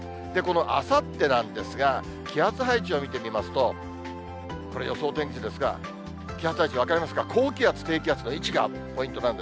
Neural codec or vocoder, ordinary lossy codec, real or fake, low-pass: none; none; real; none